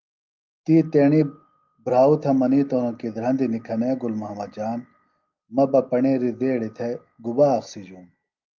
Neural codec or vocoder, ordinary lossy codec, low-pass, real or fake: none; Opus, 24 kbps; 7.2 kHz; real